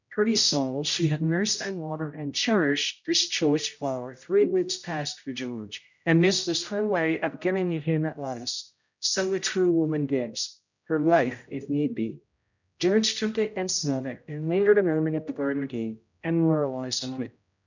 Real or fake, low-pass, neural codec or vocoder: fake; 7.2 kHz; codec, 16 kHz, 0.5 kbps, X-Codec, HuBERT features, trained on general audio